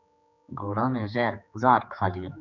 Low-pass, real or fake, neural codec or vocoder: 7.2 kHz; fake; codec, 16 kHz, 4 kbps, X-Codec, HuBERT features, trained on general audio